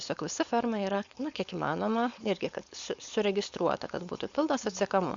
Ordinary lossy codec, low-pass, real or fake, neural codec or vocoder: Opus, 64 kbps; 7.2 kHz; fake; codec, 16 kHz, 4.8 kbps, FACodec